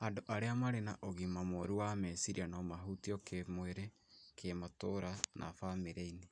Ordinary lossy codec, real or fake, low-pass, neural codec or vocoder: none; real; 9.9 kHz; none